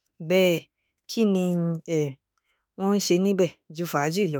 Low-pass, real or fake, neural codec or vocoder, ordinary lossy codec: none; fake; autoencoder, 48 kHz, 32 numbers a frame, DAC-VAE, trained on Japanese speech; none